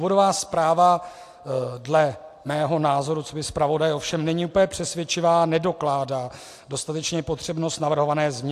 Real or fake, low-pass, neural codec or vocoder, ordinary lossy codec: real; 14.4 kHz; none; AAC, 64 kbps